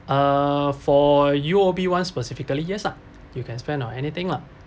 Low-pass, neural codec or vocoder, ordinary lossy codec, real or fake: none; none; none; real